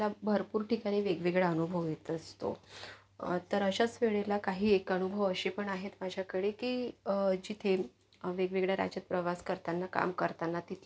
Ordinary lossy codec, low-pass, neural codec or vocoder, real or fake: none; none; none; real